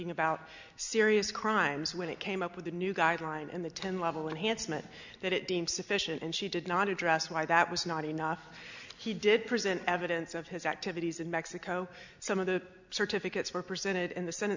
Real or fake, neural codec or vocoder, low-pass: real; none; 7.2 kHz